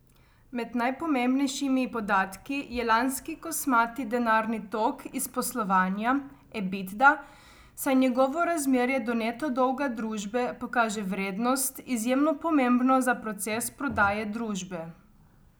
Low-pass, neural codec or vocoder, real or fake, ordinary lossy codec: none; none; real; none